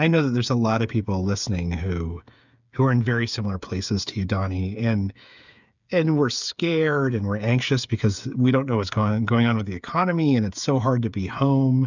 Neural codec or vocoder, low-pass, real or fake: codec, 16 kHz, 8 kbps, FreqCodec, smaller model; 7.2 kHz; fake